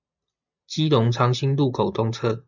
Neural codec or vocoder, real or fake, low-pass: none; real; 7.2 kHz